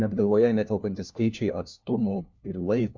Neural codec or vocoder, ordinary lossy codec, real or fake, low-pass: codec, 16 kHz, 1 kbps, FunCodec, trained on LibriTTS, 50 frames a second; MP3, 64 kbps; fake; 7.2 kHz